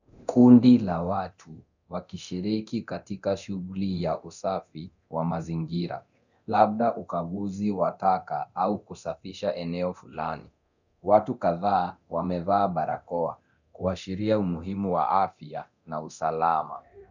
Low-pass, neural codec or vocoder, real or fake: 7.2 kHz; codec, 24 kHz, 0.9 kbps, DualCodec; fake